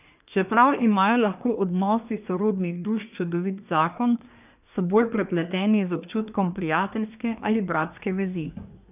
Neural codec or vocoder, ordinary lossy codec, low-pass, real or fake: codec, 24 kHz, 1 kbps, SNAC; none; 3.6 kHz; fake